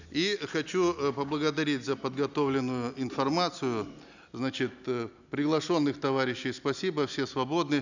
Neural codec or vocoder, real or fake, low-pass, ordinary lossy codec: none; real; 7.2 kHz; none